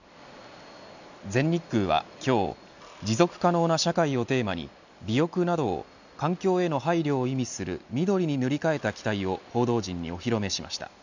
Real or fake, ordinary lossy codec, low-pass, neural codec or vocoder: real; none; 7.2 kHz; none